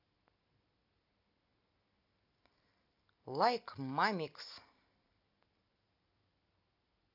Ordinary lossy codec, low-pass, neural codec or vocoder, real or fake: AAC, 48 kbps; 5.4 kHz; none; real